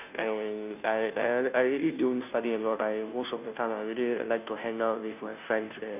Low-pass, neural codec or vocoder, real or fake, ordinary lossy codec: 3.6 kHz; codec, 16 kHz, 0.5 kbps, FunCodec, trained on Chinese and English, 25 frames a second; fake; none